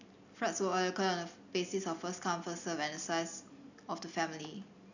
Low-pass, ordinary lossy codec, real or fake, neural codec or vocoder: 7.2 kHz; none; real; none